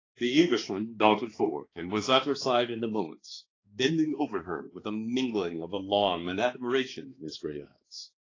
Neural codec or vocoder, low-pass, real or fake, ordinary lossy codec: codec, 16 kHz, 2 kbps, X-Codec, HuBERT features, trained on balanced general audio; 7.2 kHz; fake; AAC, 32 kbps